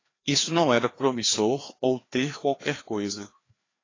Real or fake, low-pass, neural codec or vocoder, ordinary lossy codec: fake; 7.2 kHz; codec, 16 kHz, 2 kbps, FreqCodec, larger model; AAC, 32 kbps